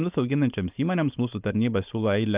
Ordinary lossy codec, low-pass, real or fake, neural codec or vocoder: Opus, 24 kbps; 3.6 kHz; fake; codec, 16 kHz, 4.8 kbps, FACodec